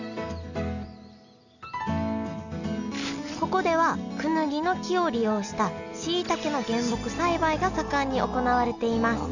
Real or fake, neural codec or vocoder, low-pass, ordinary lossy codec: real; none; 7.2 kHz; none